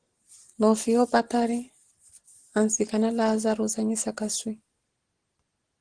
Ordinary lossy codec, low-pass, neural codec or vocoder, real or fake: Opus, 16 kbps; 9.9 kHz; none; real